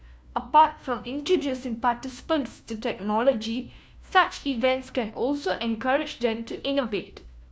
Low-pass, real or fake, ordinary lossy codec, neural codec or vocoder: none; fake; none; codec, 16 kHz, 1 kbps, FunCodec, trained on LibriTTS, 50 frames a second